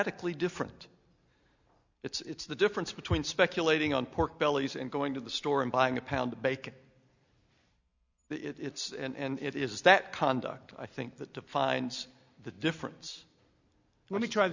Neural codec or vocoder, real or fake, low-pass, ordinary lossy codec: none; real; 7.2 kHz; Opus, 64 kbps